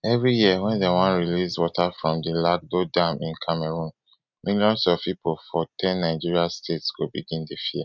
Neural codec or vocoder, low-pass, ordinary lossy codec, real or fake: none; 7.2 kHz; none; real